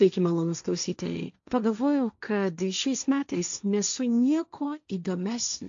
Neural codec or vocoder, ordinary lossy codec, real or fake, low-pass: codec, 16 kHz, 1.1 kbps, Voila-Tokenizer; AAC, 64 kbps; fake; 7.2 kHz